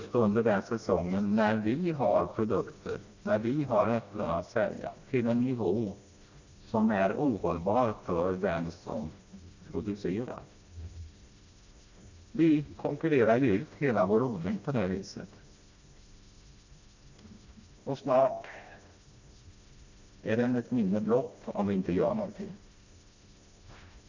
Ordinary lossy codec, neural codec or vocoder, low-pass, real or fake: Opus, 64 kbps; codec, 16 kHz, 1 kbps, FreqCodec, smaller model; 7.2 kHz; fake